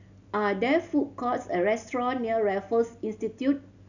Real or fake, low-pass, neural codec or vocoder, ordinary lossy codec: real; 7.2 kHz; none; none